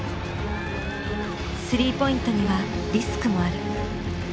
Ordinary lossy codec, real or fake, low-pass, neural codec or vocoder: none; real; none; none